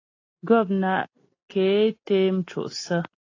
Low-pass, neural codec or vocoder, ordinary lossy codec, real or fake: 7.2 kHz; none; AAC, 32 kbps; real